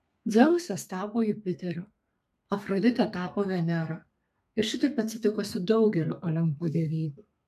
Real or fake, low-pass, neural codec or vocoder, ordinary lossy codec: fake; 14.4 kHz; codec, 32 kHz, 1.9 kbps, SNAC; MP3, 96 kbps